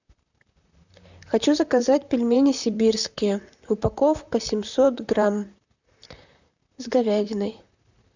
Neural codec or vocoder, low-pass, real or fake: vocoder, 44.1 kHz, 128 mel bands, Pupu-Vocoder; 7.2 kHz; fake